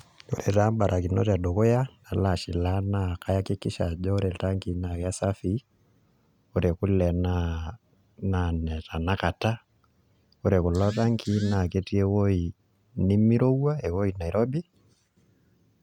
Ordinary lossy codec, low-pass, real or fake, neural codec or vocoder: none; 19.8 kHz; real; none